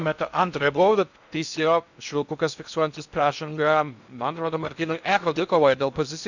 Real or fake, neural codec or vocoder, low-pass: fake; codec, 16 kHz in and 24 kHz out, 0.6 kbps, FocalCodec, streaming, 2048 codes; 7.2 kHz